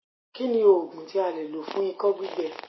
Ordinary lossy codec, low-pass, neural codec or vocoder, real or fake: MP3, 24 kbps; 7.2 kHz; none; real